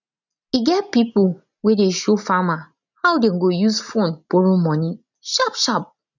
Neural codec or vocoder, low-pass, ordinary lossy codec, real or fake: none; 7.2 kHz; none; real